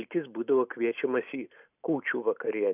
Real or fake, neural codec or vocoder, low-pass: real; none; 3.6 kHz